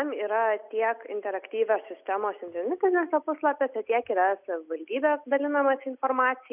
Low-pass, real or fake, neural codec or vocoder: 3.6 kHz; real; none